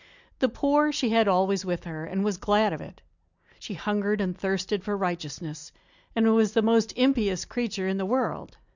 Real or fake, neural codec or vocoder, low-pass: real; none; 7.2 kHz